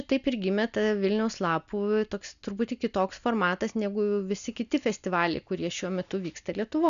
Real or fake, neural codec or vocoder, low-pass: real; none; 7.2 kHz